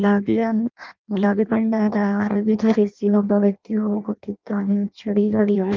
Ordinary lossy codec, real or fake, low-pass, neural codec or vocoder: Opus, 32 kbps; fake; 7.2 kHz; codec, 16 kHz in and 24 kHz out, 0.6 kbps, FireRedTTS-2 codec